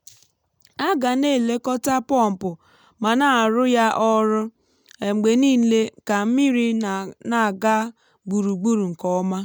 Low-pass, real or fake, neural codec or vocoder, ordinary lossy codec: none; real; none; none